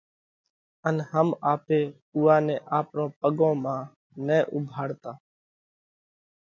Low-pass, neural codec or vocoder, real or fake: 7.2 kHz; none; real